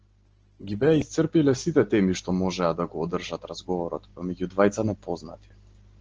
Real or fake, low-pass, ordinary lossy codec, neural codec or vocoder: real; 7.2 kHz; Opus, 16 kbps; none